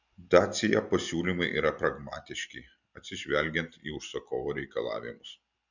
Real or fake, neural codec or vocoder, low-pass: real; none; 7.2 kHz